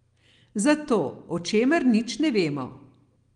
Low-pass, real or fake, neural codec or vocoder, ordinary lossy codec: 9.9 kHz; real; none; Opus, 24 kbps